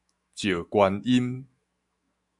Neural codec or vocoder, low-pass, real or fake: autoencoder, 48 kHz, 128 numbers a frame, DAC-VAE, trained on Japanese speech; 10.8 kHz; fake